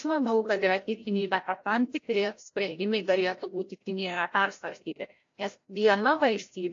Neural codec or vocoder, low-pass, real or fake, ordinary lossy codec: codec, 16 kHz, 0.5 kbps, FreqCodec, larger model; 7.2 kHz; fake; AAC, 48 kbps